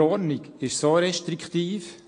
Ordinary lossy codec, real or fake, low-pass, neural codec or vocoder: AAC, 48 kbps; real; 9.9 kHz; none